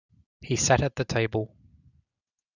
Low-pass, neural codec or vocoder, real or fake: 7.2 kHz; none; real